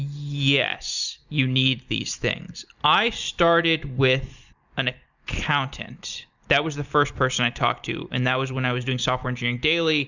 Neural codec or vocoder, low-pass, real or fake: none; 7.2 kHz; real